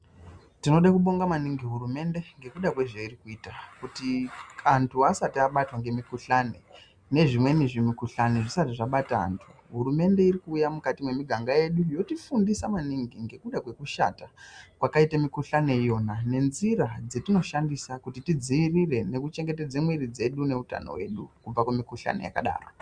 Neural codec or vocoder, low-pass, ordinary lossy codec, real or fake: none; 9.9 kHz; Opus, 64 kbps; real